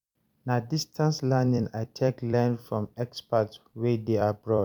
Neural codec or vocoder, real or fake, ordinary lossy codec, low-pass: vocoder, 44.1 kHz, 128 mel bands every 256 samples, BigVGAN v2; fake; none; 19.8 kHz